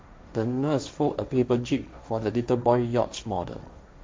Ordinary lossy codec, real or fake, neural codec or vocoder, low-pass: none; fake; codec, 16 kHz, 1.1 kbps, Voila-Tokenizer; none